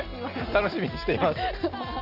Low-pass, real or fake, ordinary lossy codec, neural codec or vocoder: 5.4 kHz; real; none; none